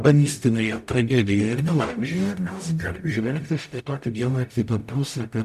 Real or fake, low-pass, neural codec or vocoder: fake; 14.4 kHz; codec, 44.1 kHz, 0.9 kbps, DAC